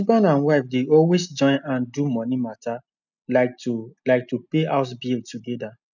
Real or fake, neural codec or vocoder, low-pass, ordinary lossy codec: real; none; 7.2 kHz; none